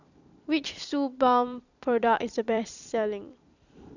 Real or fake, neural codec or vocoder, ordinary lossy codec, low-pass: fake; vocoder, 44.1 kHz, 128 mel bands every 256 samples, BigVGAN v2; none; 7.2 kHz